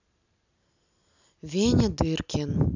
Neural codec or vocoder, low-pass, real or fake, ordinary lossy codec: none; 7.2 kHz; real; none